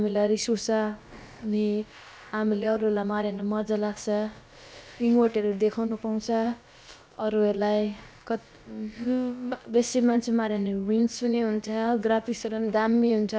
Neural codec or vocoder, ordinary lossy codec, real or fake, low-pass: codec, 16 kHz, about 1 kbps, DyCAST, with the encoder's durations; none; fake; none